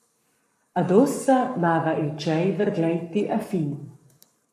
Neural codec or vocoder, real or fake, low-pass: codec, 44.1 kHz, 7.8 kbps, Pupu-Codec; fake; 14.4 kHz